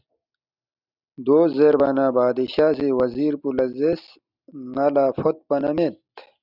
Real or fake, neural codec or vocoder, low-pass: real; none; 5.4 kHz